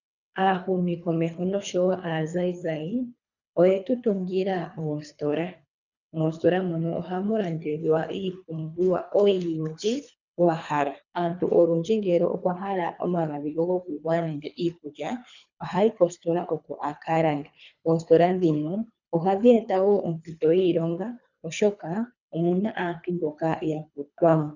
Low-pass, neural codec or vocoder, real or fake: 7.2 kHz; codec, 24 kHz, 3 kbps, HILCodec; fake